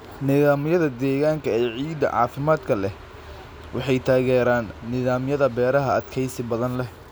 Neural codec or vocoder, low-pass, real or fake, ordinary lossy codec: none; none; real; none